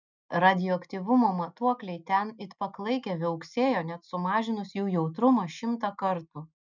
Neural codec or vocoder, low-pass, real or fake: none; 7.2 kHz; real